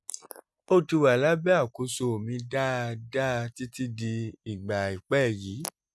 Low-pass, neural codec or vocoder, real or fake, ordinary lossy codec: none; none; real; none